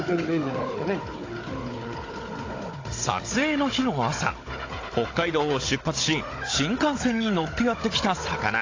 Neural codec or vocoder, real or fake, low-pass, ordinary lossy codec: codec, 16 kHz, 16 kbps, FunCodec, trained on Chinese and English, 50 frames a second; fake; 7.2 kHz; AAC, 32 kbps